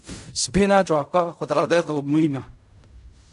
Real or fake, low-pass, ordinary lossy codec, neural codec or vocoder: fake; 10.8 kHz; MP3, 96 kbps; codec, 16 kHz in and 24 kHz out, 0.4 kbps, LongCat-Audio-Codec, fine tuned four codebook decoder